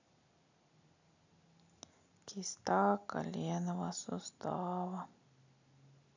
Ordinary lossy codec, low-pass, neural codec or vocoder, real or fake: none; 7.2 kHz; none; real